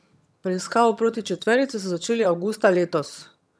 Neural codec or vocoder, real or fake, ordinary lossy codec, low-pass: vocoder, 22.05 kHz, 80 mel bands, HiFi-GAN; fake; none; none